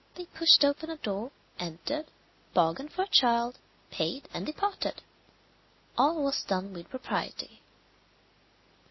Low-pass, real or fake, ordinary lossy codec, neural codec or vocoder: 7.2 kHz; real; MP3, 24 kbps; none